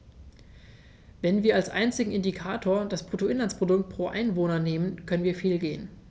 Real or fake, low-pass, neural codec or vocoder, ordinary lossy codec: real; none; none; none